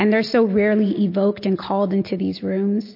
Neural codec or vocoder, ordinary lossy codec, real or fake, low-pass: none; MP3, 32 kbps; real; 5.4 kHz